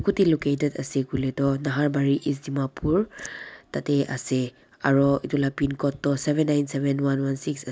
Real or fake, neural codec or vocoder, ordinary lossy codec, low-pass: real; none; none; none